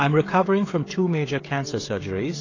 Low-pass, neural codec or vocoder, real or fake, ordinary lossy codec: 7.2 kHz; codec, 16 kHz, 16 kbps, FreqCodec, smaller model; fake; AAC, 32 kbps